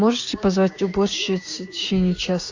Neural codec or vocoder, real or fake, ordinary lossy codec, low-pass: codec, 16 kHz, 6 kbps, DAC; fake; AAC, 48 kbps; 7.2 kHz